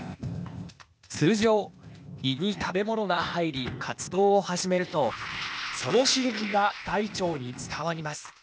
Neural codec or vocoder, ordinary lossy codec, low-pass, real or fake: codec, 16 kHz, 0.8 kbps, ZipCodec; none; none; fake